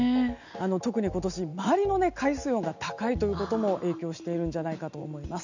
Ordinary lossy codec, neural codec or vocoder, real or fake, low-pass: none; none; real; 7.2 kHz